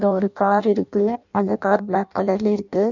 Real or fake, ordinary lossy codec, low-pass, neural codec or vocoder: fake; none; 7.2 kHz; codec, 16 kHz in and 24 kHz out, 0.6 kbps, FireRedTTS-2 codec